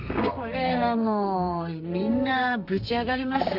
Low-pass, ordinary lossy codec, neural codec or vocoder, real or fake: 5.4 kHz; none; codec, 32 kHz, 1.9 kbps, SNAC; fake